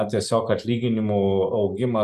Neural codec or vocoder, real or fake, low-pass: none; real; 14.4 kHz